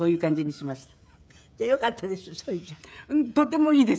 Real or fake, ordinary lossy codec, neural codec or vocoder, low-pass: fake; none; codec, 16 kHz, 16 kbps, FreqCodec, smaller model; none